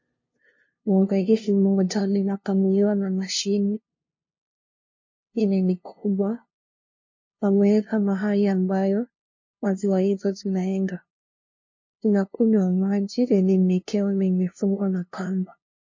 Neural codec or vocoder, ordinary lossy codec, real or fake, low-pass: codec, 16 kHz, 0.5 kbps, FunCodec, trained on LibriTTS, 25 frames a second; MP3, 32 kbps; fake; 7.2 kHz